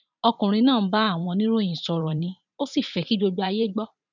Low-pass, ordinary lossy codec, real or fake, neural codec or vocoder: 7.2 kHz; none; fake; vocoder, 44.1 kHz, 128 mel bands every 512 samples, BigVGAN v2